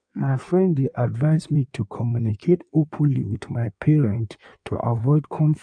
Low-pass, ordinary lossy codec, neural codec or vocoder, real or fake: 9.9 kHz; none; codec, 16 kHz in and 24 kHz out, 1.1 kbps, FireRedTTS-2 codec; fake